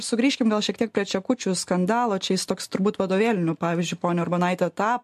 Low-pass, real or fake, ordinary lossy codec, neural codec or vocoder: 14.4 kHz; real; MP3, 64 kbps; none